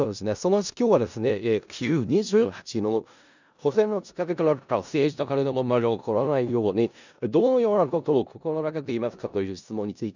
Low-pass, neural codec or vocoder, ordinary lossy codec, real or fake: 7.2 kHz; codec, 16 kHz in and 24 kHz out, 0.4 kbps, LongCat-Audio-Codec, four codebook decoder; none; fake